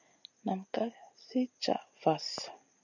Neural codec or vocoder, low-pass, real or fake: none; 7.2 kHz; real